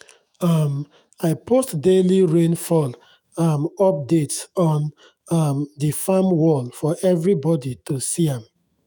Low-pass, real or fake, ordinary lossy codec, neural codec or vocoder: none; fake; none; autoencoder, 48 kHz, 128 numbers a frame, DAC-VAE, trained on Japanese speech